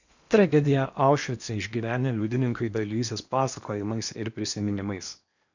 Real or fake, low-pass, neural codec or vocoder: fake; 7.2 kHz; codec, 16 kHz in and 24 kHz out, 0.8 kbps, FocalCodec, streaming, 65536 codes